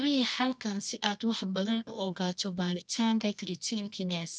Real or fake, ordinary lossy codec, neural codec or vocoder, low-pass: fake; none; codec, 24 kHz, 0.9 kbps, WavTokenizer, medium music audio release; 9.9 kHz